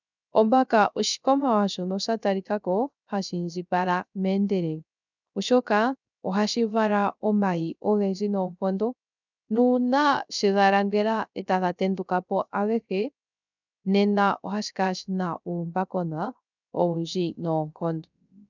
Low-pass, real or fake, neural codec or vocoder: 7.2 kHz; fake; codec, 16 kHz, 0.3 kbps, FocalCodec